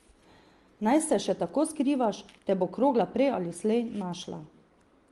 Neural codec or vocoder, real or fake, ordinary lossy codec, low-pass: none; real; Opus, 24 kbps; 10.8 kHz